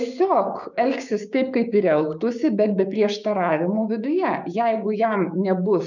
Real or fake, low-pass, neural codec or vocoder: fake; 7.2 kHz; codec, 16 kHz, 6 kbps, DAC